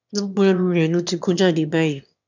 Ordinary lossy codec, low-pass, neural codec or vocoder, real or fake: none; 7.2 kHz; autoencoder, 22.05 kHz, a latent of 192 numbers a frame, VITS, trained on one speaker; fake